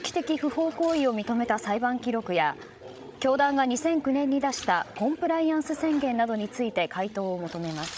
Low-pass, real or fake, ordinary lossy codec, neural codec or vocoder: none; fake; none; codec, 16 kHz, 16 kbps, FreqCodec, larger model